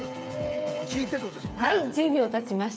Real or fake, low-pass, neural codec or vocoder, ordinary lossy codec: fake; none; codec, 16 kHz, 8 kbps, FreqCodec, smaller model; none